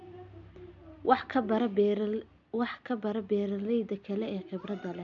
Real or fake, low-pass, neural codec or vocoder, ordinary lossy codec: real; 7.2 kHz; none; none